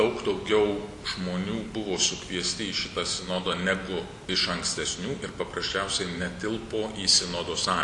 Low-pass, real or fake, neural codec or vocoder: 10.8 kHz; real; none